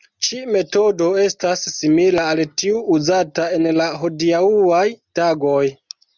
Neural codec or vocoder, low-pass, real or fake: none; 7.2 kHz; real